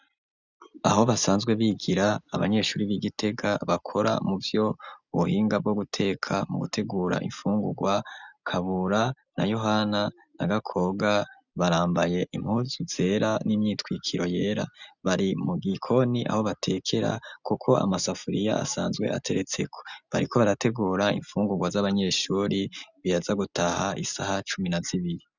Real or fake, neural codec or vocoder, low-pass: real; none; 7.2 kHz